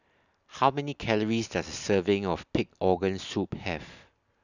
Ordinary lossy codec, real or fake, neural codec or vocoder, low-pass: none; real; none; 7.2 kHz